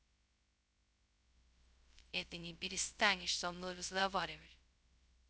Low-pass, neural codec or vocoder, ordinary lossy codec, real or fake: none; codec, 16 kHz, 0.2 kbps, FocalCodec; none; fake